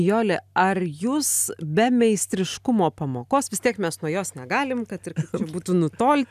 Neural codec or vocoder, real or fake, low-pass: none; real; 14.4 kHz